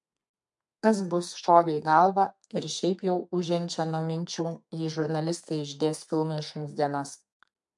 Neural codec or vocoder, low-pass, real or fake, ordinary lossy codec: codec, 32 kHz, 1.9 kbps, SNAC; 10.8 kHz; fake; MP3, 64 kbps